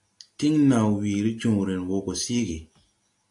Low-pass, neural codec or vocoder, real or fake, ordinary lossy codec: 10.8 kHz; none; real; AAC, 64 kbps